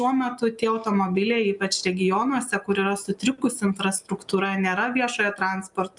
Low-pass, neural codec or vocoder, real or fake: 10.8 kHz; none; real